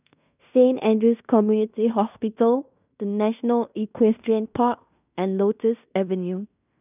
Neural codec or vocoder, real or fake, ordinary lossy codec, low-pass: codec, 16 kHz in and 24 kHz out, 0.9 kbps, LongCat-Audio-Codec, fine tuned four codebook decoder; fake; none; 3.6 kHz